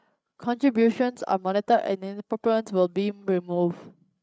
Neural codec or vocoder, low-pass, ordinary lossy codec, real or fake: codec, 16 kHz, 16 kbps, FreqCodec, larger model; none; none; fake